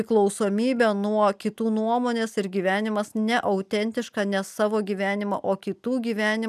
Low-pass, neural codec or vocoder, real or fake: 14.4 kHz; none; real